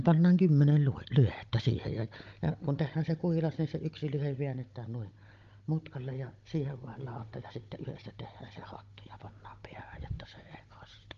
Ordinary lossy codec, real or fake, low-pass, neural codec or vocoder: Opus, 32 kbps; fake; 7.2 kHz; codec, 16 kHz, 16 kbps, FunCodec, trained on Chinese and English, 50 frames a second